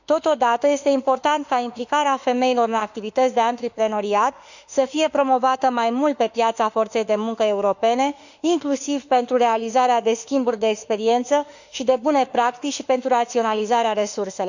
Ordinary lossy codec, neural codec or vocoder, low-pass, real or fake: none; autoencoder, 48 kHz, 32 numbers a frame, DAC-VAE, trained on Japanese speech; 7.2 kHz; fake